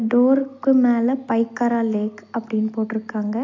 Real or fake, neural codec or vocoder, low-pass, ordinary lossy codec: real; none; 7.2 kHz; MP3, 48 kbps